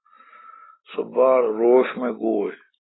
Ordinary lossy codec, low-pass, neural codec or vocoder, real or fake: AAC, 16 kbps; 7.2 kHz; none; real